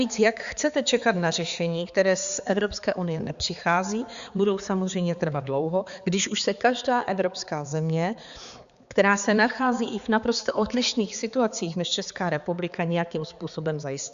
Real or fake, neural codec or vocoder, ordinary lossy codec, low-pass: fake; codec, 16 kHz, 4 kbps, X-Codec, HuBERT features, trained on balanced general audio; Opus, 64 kbps; 7.2 kHz